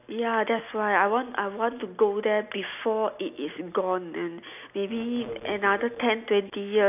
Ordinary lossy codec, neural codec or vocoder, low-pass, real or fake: none; none; 3.6 kHz; real